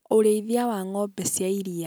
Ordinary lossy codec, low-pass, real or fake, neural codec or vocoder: none; none; real; none